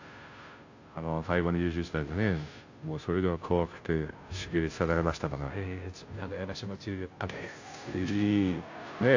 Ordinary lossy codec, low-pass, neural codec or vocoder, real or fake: AAC, 48 kbps; 7.2 kHz; codec, 16 kHz, 0.5 kbps, FunCodec, trained on Chinese and English, 25 frames a second; fake